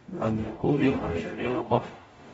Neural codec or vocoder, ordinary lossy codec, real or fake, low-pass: codec, 44.1 kHz, 0.9 kbps, DAC; AAC, 24 kbps; fake; 19.8 kHz